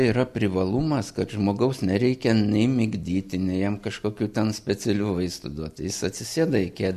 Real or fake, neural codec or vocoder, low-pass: real; none; 14.4 kHz